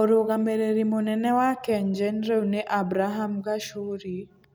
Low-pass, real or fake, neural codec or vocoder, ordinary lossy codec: none; real; none; none